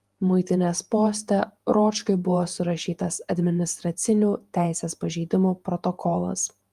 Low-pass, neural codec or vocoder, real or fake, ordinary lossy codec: 14.4 kHz; vocoder, 48 kHz, 128 mel bands, Vocos; fake; Opus, 32 kbps